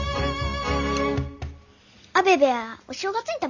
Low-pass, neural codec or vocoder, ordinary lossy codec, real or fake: 7.2 kHz; none; none; real